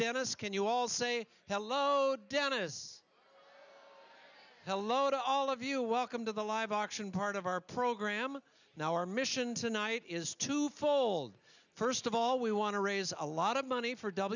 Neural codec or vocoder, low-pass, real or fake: none; 7.2 kHz; real